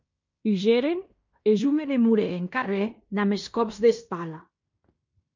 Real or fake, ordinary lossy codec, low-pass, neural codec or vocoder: fake; MP3, 48 kbps; 7.2 kHz; codec, 16 kHz in and 24 kHz out, 0.9 kbps, LongCat-Audio-Codec, fine tuned four codebook decoder